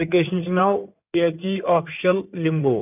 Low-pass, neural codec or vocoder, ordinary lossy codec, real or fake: 3.6 kHz; codec, 16 kHz in and 24 kHz out, 2.2 kbps, FireRedTTS-2 codec; none; fake